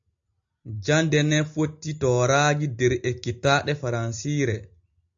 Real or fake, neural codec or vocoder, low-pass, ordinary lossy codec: real; none; 7.2 kHz; AAC, 64 kbps